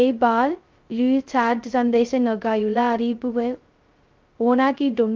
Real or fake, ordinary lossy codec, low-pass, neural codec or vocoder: fake; Opus, 32 kbps; 7.2 kHz; codec, 16 kHz, 0.2 kbps, FocalCodec